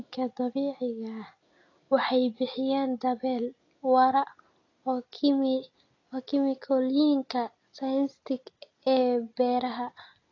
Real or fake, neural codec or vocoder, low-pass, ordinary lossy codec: real; none; 7.2 kHz; AAC, 32 kbps